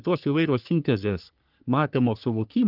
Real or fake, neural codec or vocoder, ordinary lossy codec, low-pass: fake; codec, 44.1 kHz, 3.4 kbps, Pupu-Codec; Opus, 32 kbps; 5.4 kHz